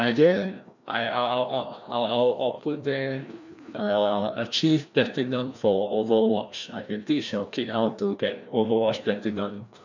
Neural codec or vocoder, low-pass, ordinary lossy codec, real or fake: codec, 16 kHz, 1 kbps, FreqCodec, larger model; 7.2 kHz; none; fake